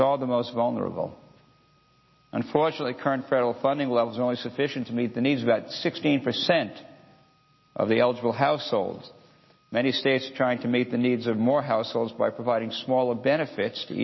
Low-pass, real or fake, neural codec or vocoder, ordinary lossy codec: 7.2 kHz; real; none; MP3, 24 kbps